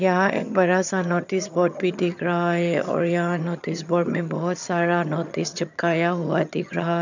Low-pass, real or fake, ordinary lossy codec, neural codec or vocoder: 7.2 kHz; fake; none; vocoder, 22.05 kHz, 80 mel bands, HiFi-GAN